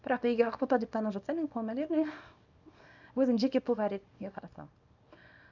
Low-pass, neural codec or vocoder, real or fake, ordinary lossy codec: 7.2 kHz; codec, 24 kHz, 0.9 kbps, WavTokenizer, small release; fake; none